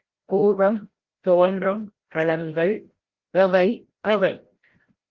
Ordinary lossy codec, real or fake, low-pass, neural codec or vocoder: Opus, 16 kbps; fake; 7.2 kHz; codec, 16 kHz, 0.5 kbps, FreqCodec, larger model